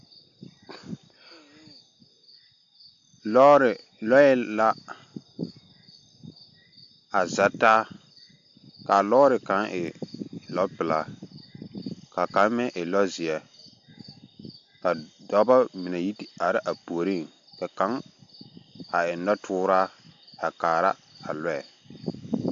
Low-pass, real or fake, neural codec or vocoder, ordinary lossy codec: 7.2 kHz; real; none; AAC, 64 kbps